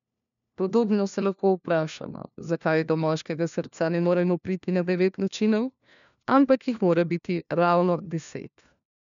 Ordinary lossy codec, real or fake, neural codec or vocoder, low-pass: none; fake; codec, 16 kHz, 1 kbps, FunCodec, trained on LibriTTS, 50 frames a second; 7.2 kHz